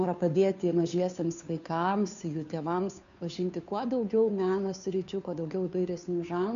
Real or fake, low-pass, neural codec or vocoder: fake; 7.2 kHz; codec, 16 kHz, 2 kbps, FunCodec, trained on Chinese and English, 25 frames a second